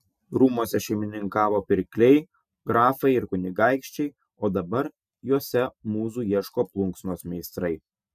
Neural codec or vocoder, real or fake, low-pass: none; real; 14.4 kHz